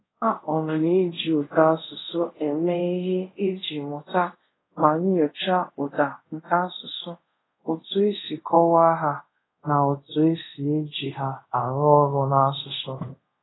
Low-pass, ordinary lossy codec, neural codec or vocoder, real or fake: 7.2 kHz; AAC, 16 kbps; codec, 24 kHz, 0.5 kbps, DualCodec; fake